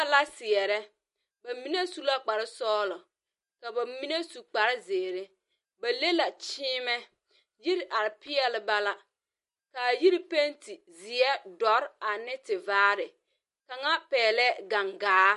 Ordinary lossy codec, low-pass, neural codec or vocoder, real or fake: MP3, 48 kbps; 14.4 kHz; none; real